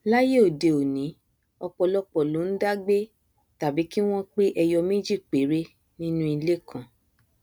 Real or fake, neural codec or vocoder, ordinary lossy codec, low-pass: real; none; none; none